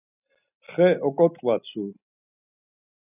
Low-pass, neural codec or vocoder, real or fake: 3.6 kHz; none; real